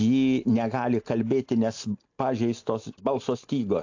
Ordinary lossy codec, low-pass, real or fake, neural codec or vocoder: AAC, 48 kbps; 7.2 kHz; real; none